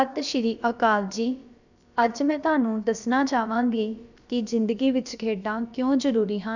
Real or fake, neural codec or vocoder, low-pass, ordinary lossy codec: fake; codec, 16 kHz, about 1 kbps, DyCAST, with the encoder's durations; 7.2 kHz; none